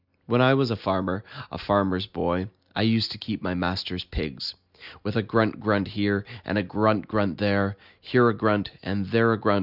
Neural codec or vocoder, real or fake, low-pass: none; real; 5.4 kHz